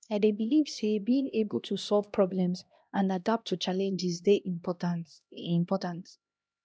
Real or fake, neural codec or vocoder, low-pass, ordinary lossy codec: fake; codec, 16 kHz, 1 kbps, X-Codec, HuBERT features, trained on LibriSpeech; none; none